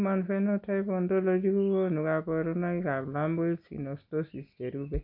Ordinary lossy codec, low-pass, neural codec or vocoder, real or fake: none; 5.4 kHz; none; real